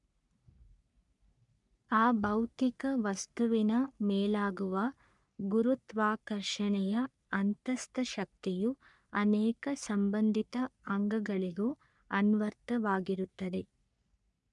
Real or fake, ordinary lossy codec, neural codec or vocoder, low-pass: fake; none; codec, 44.1 kHz, 3.4 kbps, Pupu-Codec; 10.8 kHz